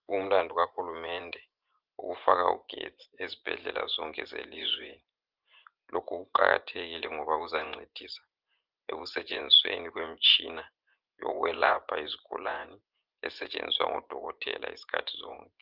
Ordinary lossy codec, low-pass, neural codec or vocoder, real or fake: Opus, 32 kbps; 5.4 kHz; vocoder, 24 kHz, 100 mel bands, Vocos; fake